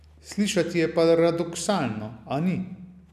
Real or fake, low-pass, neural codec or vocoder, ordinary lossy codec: real; 14.4 kHz; none; none